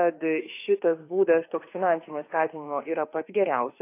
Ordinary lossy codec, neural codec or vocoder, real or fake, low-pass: AAC, 24 kbps; autoencoder, 48 kHz, 32 numbers a frame, DAC-VAE, trained on Japanese speech; fake; 3.6 kHz